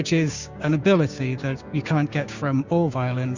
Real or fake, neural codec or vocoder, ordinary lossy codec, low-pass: fake; codec, 16 kHz, 6 kbps, DAC; Opus, 64 kbps; 7.2 kHz